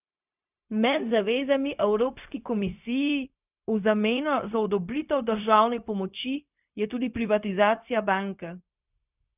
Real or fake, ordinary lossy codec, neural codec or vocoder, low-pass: fake; none; codec, 16 kHz, 0.4 kbps, LongCat-Audio-Codec; 3.6 kHz